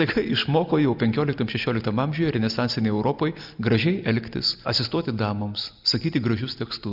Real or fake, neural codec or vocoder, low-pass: real; none; 5.4 kHz